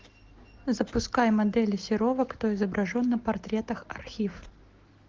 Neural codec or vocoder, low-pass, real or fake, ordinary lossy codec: none; 7.2 kHz; real; Opus, 16 kbps